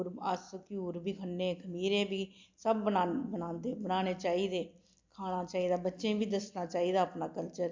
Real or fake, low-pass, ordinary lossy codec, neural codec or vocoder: real; 7.2 kHz; MP3, 64 kbps; none